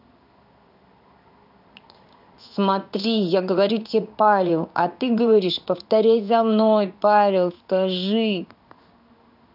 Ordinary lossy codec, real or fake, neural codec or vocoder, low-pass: none; fake; codec, 16 kHz in and 24 kHz out, 1 kbps, XY-Tokenizer; 5.4 kHz